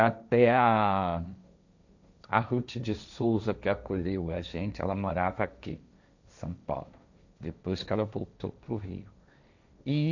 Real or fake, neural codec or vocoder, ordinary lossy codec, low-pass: fake; codec, 16 kHz, 1.1 kbps, Voila-Tokenizer; none; 7.2 kHz